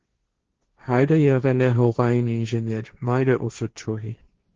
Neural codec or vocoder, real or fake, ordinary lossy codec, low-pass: codec, 16 kHz, 1.1 kbps, Voila-Tokenizer; fake; Opus, 16 kbps; 7.2 kHz